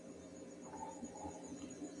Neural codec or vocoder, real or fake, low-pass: none; real; 10.8 kHz